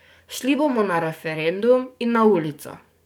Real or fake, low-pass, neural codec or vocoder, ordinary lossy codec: fake; none; codec, 44.1 kHz, 7.8 kbps, DAC; none